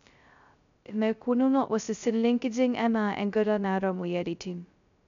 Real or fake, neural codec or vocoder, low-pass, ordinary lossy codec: fake; codec, 16 kHz, 0.2 kbps, FocalCodec; 7.2 kHz; none